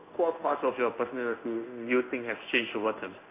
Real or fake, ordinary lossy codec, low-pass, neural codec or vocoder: fake; none; 3.6 kHz; codec, 16 kHz in and 24 kHz out, 1 kbps, XY-Tokenizer